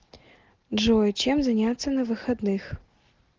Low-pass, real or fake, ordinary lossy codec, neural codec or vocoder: 7.2 kHz; real; Opus, 16 kbps; none